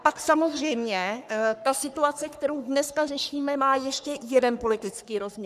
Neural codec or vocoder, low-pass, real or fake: codec, 44.1 kHz, 3.4 kbps, Pupu-Codec; 14.4 kHz; fake